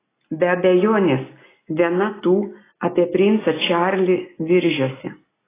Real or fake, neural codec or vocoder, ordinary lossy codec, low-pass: real; none; AAC, 16 kbps; 3.6 kHz